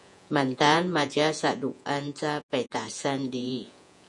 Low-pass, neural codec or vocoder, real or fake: 10.8 kHz; vocoder, 48 kHz, 128 mel bands, Vocos; fake